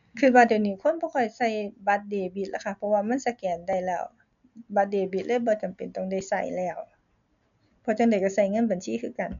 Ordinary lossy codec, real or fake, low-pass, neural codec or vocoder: none; real; 7.2 kHz; none